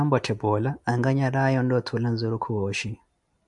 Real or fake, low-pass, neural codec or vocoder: real; 10.8 kHz; none